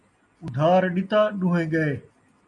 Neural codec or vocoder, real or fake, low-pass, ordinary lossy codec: none; real; 10.8 kHz; MP3, 48 kbps